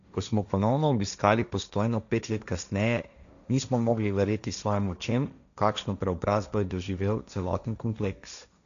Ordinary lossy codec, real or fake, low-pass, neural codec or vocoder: none; fake; 7.2 kHz; codec, 16 kHz, 1.1 kbps, Voila-Tokenizer